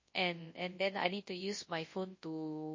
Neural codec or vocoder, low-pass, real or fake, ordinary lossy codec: codec, 24 kHz, 0.9 kbps, WavTokenizer, large speech release; 7.2 kHz; fake; MP3, 32 kbps